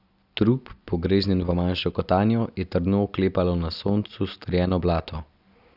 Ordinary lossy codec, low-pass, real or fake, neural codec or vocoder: none; 5.4 kHz; real; none